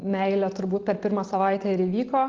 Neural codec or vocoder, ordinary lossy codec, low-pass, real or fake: none; Opus, 16 kbps; 7.2 kHz; real